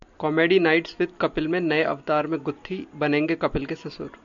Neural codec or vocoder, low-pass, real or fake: none; 7.2 kHz; real